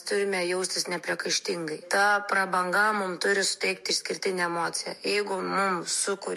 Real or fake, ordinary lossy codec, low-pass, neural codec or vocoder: fake; MP3, 64 kbps; 14.4 kHz; autoencoder, 48 kHz, 128 numbers a frame, DAC-VAE, trained on Japanese speech